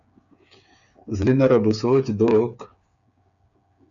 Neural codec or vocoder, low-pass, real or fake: codec, 16 kHz, 8 kbps, FreqCodec, smaller model; 7.2 kHz; fake